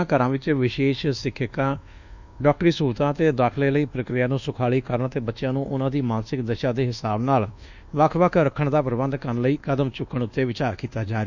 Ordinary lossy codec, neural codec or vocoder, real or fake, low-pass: none; codec, 24 kHz, 1.2 kbps, DualCodec; fake; 7.2 kHz